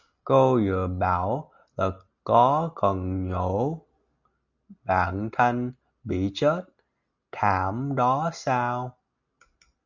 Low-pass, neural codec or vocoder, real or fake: 7.2 kHz; none; real